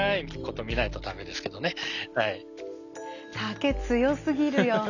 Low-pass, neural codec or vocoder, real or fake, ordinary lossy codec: 7.2 kHz; none; real; none